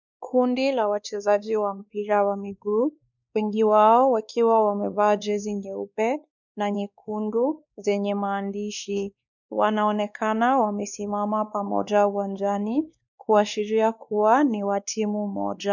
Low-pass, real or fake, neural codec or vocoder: 7.2 kHz; fake; codec, 16 kHz, 2 kbps, X-Codec, WavLM features, trained on Multilingual LibriSpeech